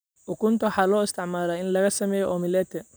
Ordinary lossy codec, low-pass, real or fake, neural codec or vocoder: none; none; real; none